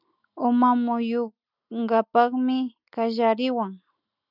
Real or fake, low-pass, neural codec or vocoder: real; 5.4 kHz; none